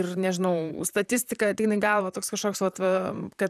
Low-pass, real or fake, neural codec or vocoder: 14.4 kHz; fake; vocoder, 44.1 kHz, 128 mel bands, Pupu-Vocoder